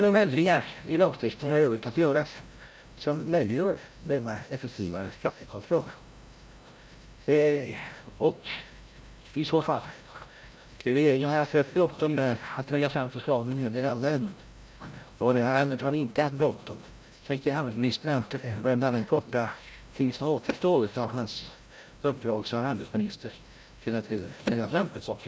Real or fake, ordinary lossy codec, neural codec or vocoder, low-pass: fake; none; codec, 16 kHz, 0.5 kbps, FreqCodec, larger model; none